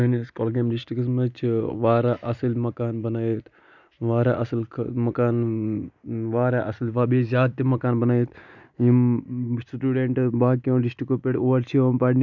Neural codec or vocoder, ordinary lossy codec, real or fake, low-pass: none; none; real; 7.2 kHz